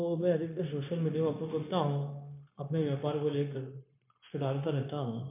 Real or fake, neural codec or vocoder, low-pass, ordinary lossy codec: fake; codec, 16 kHz, 0.9 kbps, LongCat-Audio-Codec; 3.6 kHz; MP3, 24 kbps